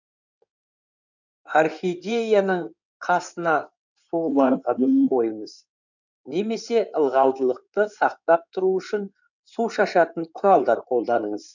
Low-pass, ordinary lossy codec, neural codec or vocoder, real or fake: 7.2 kHz; none; codec, 16 kHz in and 24 kHz out, 2.2 kbps, FireRedTTS-2 codec; fake